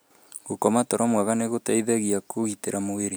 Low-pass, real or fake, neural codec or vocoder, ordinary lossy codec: none; real; none; none